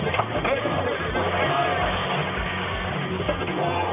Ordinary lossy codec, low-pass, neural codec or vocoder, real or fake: none; 3.6 kHz; codec, 44.1 kHz, 1.7 kbps, Pupu-Codec; fake